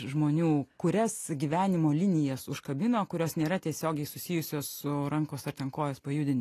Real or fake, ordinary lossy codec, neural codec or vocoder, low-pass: real; AAC, 48 kbps; none; 14.4 kHz